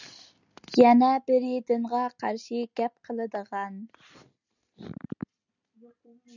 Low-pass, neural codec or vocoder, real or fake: 7.2 kHz; none; real